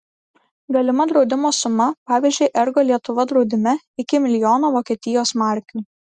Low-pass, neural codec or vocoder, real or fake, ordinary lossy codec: 10.8 kHz; none; real; Opus, 64 kbps